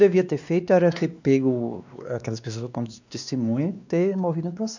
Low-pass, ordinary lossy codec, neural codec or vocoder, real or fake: 7.2 kHz; none; codec, 16 kHz, 2 kbps, X-Codec, WavLM features, trained on Multilingual LibriSpeech; fake